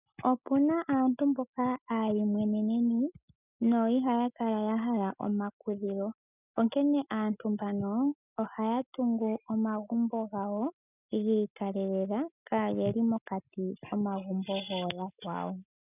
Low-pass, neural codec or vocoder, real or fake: 3.6 kHz; none; real